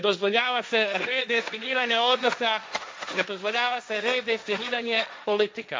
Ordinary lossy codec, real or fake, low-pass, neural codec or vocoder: none; fake; none; codec, 16 kHz, 1.1 kbps, Voila-Tokenizer